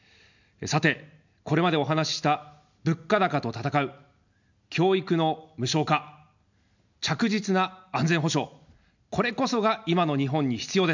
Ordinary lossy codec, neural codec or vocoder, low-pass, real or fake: none; none; 7.2 kHz; real